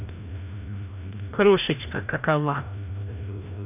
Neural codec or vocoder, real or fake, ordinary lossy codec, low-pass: codec, 16 kHz, 1 kbps, FreqCodec, larger model; fake; none; 3.6 kHz